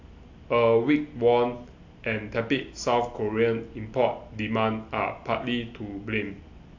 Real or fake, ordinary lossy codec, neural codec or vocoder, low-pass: real; AAC, 48 kbps; none; 7.2 kHz